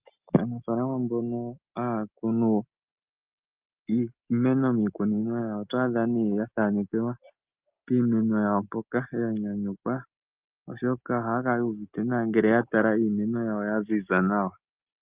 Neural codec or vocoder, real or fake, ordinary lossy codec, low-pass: none; real; Opus, 24 kbps; 3.6 kHz